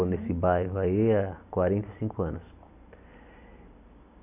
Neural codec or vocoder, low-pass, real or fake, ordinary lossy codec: none; 3.6 kHz; real; none